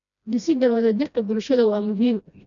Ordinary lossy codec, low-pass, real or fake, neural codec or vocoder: none; 7.2 kHz; fake; codec, 16 kHz, 1 kbps, FreqCodec, smaller model